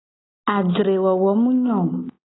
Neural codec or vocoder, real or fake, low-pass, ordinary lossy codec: none; real; 7.2 kHz; AAC, 16 kbps